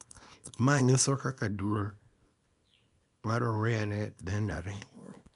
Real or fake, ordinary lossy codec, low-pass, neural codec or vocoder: fake; none; 10.8 kHz; codec, 24 kHz, 0.9 kbps, WavTokenizer, small release